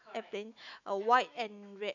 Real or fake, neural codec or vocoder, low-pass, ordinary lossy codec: fake; autoencoder, 48 kHz, 128 numbers a frame, DAC-VAE, trained on Japanese speech; 7.2 kHz; none